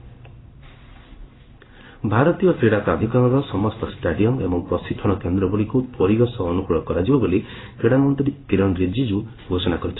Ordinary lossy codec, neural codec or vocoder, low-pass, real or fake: AAC, 16 kbps; codec, 16 kHz in and 24 kHz out, 1 kbps, XY-Tokenizer; 7.2 kHz; fake